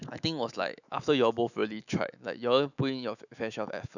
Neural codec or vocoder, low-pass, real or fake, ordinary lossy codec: autoencoder, 48 kHz, 128 numbers a frame, DAC-VAE, trained on Japanese speech; 7.2 kHz; fake; none